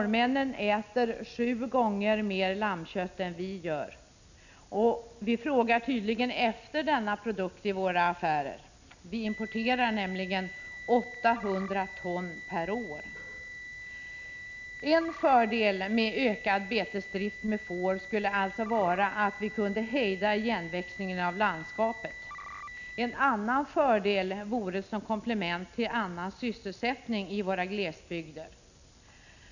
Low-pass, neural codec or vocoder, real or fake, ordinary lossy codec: 7.2 kHz; none; real; none